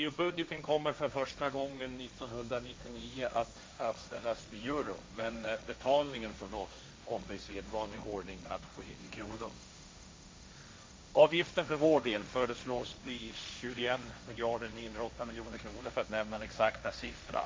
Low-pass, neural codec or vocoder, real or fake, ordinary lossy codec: none; codec, 16 kHz, 1.1 kbps, Voila-Tokenizer; fake; none